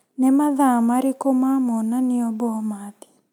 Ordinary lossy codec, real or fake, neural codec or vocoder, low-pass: none; real; none; 19.8 kHz